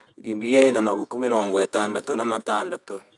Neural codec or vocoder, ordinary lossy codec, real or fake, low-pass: codec, 24 kHz, 0.9 kbps, WavTokenizer, medium music audio release; none; fake; 10.8 kHz